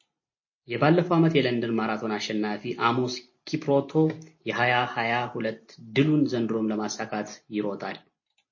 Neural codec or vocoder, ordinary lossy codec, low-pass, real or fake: none; MP3, 32 kbps; 7.2 kHz; real